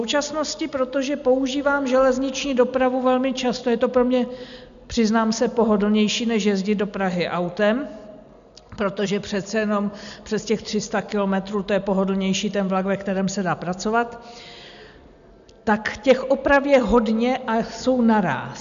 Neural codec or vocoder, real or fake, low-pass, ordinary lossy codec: none; real; 7.2 kHz; MP3, 96 kbps